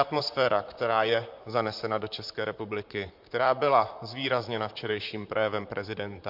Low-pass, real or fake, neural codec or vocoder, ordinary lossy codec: 5.4 kHz; fake; vocoder, 44.1 kHz, 128 mel bands, Pupu-Vocoder; MP3, 48 kbps